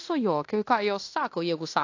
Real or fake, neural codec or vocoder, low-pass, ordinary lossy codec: fake; codec, 16 kHz in and 24 kHz out, 0.9 kbps, LongCat-Audio-Codec, fine tuned four codebook decoder; 7.2 kHz; AAC, 48 kbps